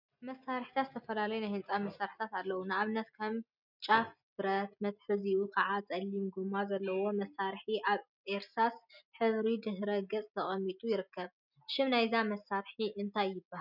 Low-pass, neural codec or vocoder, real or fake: 5.4 kHz; none; real